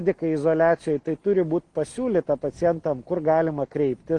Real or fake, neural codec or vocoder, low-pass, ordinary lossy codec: real; none; 10.8 kHz; Opus, 24 kbps